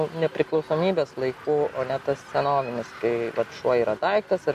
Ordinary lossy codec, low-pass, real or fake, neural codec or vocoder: AAC, 96 kbps; 14.4 kHz; real; none